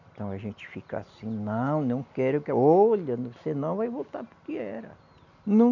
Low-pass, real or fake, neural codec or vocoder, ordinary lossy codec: 7.2 kHz; real; none; none